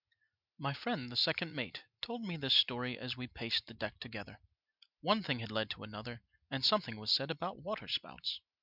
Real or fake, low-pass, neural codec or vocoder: real; 5.4 kHz; none